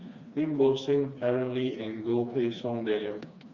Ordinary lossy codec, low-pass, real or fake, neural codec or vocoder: Opus, 64 kbps; 7.2 kHz; fake; codec, 16 kHz, 2 kbps, FreqCodec, smaller model